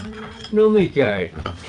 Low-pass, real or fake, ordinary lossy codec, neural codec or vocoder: 9.9 kHz; fake; none; vocoder, 22.05 kHz, 80 mel bands, WaveNeXt